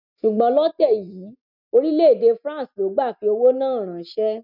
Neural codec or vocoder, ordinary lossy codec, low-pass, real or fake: none; none; 5.4 kHz; real